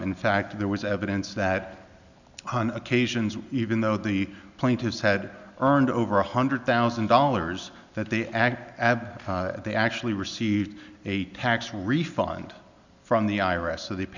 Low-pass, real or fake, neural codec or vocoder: 7.2 kHz; real; none